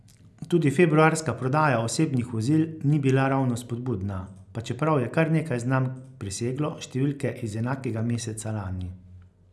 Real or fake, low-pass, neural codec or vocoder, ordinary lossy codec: real; none; none; none